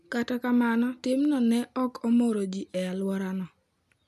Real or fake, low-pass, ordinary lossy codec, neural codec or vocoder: real; 14.4 kHz; none; none